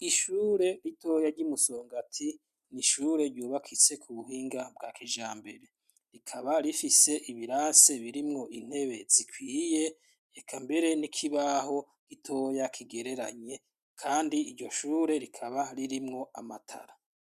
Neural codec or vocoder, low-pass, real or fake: none; 14.4 kHz; real